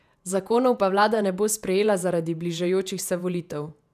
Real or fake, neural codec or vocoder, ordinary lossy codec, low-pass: fake; vocoder, 48 kHz, 128 mel bands, Vocos; none; 14.4 kHz